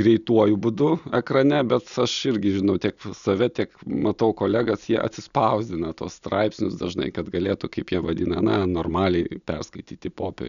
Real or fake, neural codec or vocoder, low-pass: real; none; 7.2 kHz